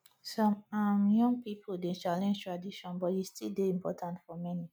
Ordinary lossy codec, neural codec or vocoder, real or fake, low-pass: none; none; real; none